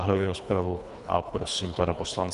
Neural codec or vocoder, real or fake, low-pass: codec, 24 kHz, 3 kbps, HILCodec; fake; 10.8 kHz